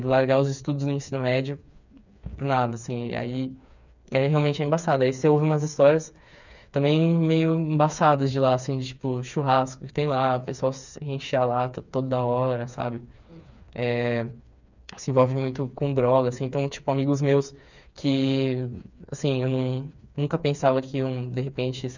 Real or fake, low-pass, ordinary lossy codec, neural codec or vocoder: fake; 7.2 kHz; none; codec, 16 kHz, 4 kbps, FreqCodec, smaller model